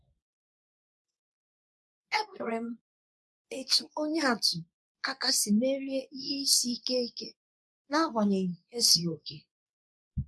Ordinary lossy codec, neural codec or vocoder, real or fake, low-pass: none; codec, 24 kHz, 0.9 kbps, WavTokenizer, medium speech release version 2; fake; none